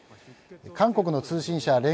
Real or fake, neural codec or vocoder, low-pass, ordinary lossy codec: real; none; none; none